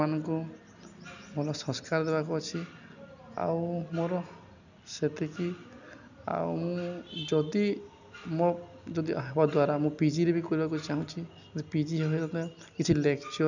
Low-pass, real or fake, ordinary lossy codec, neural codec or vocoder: 7.2 kHz; real; none; none